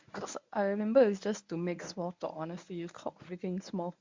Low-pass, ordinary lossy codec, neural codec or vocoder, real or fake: 7.2 kHz; MP3, 64 kbps; codec, 24 kHz, 0.9 kbps, WavTokenizer, medium speech release version 1; fake